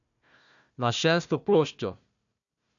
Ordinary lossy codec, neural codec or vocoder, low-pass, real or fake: none; codec, 16 kHz, 1 kbps, FunCodec, trained on Chinese and English, 50 frames a second; 7.2 kHz; fake